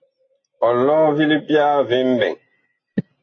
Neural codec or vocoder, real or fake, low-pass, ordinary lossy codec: none; real; 7.2 kHz; AAC, 32 kbps